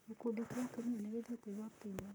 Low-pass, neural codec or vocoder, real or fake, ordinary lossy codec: none; vocoder, 44.1 kHz, 128 mel bands, Pupu-Vocoder; fake; none